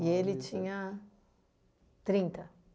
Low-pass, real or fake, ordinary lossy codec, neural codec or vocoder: none; real; none; none